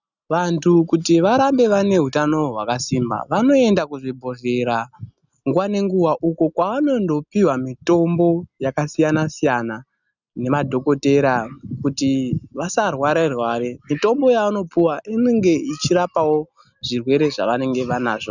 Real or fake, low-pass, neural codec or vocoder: real; 7.2 kHz; none